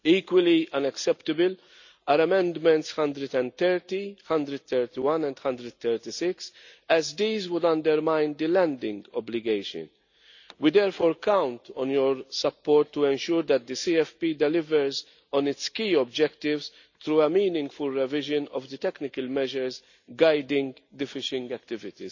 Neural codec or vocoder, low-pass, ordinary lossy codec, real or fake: none; 7.2 kHz; none; real